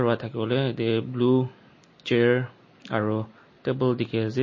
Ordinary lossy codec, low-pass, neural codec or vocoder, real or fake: MP3, 32 kbps; 7.2 kHz; none; real